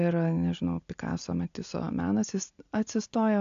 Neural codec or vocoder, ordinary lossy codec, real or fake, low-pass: none; AAC, 64 kbps; real; 7.2 kHz